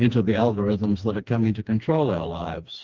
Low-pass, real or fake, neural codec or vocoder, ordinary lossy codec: 7.2 kHz; fake; codec, 16 kHz, 2 kbps, FreqCodec, smaller model; Opus, 32 kbps